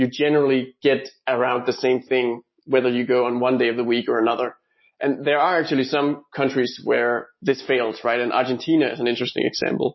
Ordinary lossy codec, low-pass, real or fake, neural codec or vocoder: MP3, 24 kbps; 7.2 kHz; real; none